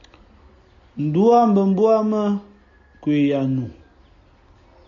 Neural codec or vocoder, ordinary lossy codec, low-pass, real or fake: none; MP3, 64 kbps; 7.2 kHz; real